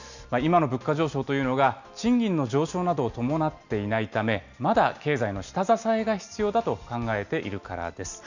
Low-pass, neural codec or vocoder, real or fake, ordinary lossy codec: 7.2 kHz; none; real; none